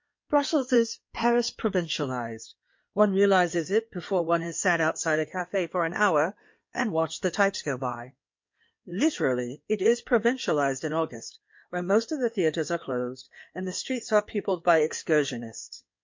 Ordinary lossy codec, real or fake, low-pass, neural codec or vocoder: MP3, 48 kbps; fake; 7.2 kHz; codec, 16 kHz in and 24 kHz out, 2.2 kbps, FireRedTTS-2 codec